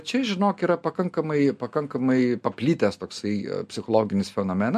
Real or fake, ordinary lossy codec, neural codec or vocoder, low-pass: real; MP3, 64 kbps; none; 14.4 kHz